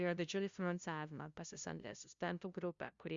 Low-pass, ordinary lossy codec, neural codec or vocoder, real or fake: 7.2 kHz; Opus, 64 kbps; codec, 16 kHz, 0.5 kbps, FunCodec, trained on LibriTTS, 25 frames a second; fake